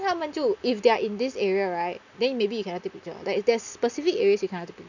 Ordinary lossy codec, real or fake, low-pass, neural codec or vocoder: none; real; 7.2 kHz; none